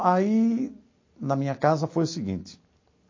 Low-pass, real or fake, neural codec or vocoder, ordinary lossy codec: 7.2 kHz; real; none; MP3, 32 kbps